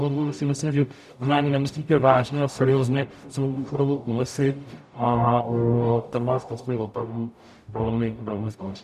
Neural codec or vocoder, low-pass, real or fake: codec, 44.1 kHz, 0.9 kbps, DAC; 14.4 kHz; fake